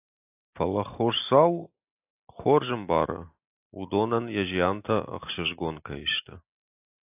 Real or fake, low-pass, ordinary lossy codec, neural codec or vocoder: real; 3.6 kHz; AAC, 32 kbps; none